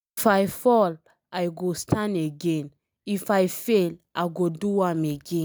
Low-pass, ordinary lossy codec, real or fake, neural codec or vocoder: none; none; real; none